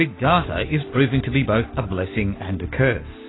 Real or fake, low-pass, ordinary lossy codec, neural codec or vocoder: real; 7.2 kHz; AAC, 16 kbps; none